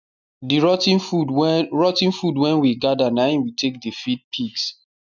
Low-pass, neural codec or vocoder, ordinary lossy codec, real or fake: 7.2 kHz; none; none; real